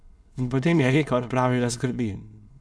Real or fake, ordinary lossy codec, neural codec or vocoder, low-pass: fake; none; autoencoder, 22.05 kHz, a latent of 192 numbers a frame, VITS, trained on many speakers; none